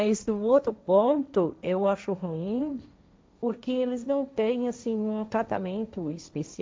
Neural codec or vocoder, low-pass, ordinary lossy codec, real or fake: codec, 16 kHz, 1.1 kbps, Voila-Tokenizer; none; none; fake